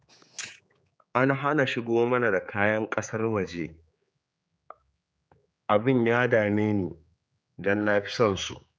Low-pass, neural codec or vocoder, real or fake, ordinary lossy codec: none; codec, 16 kHz, 4 kbps, X-Codec, HuBERT features, trained on general audio; fake; none